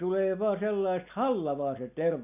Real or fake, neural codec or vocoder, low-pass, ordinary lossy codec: real; none; 3.6 kHz; none